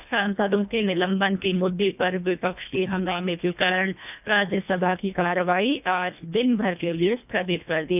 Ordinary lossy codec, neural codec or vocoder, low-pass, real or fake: none; codec, 24 kHz, 1.5 kbps, HILCodec; 3.6 kHz; fake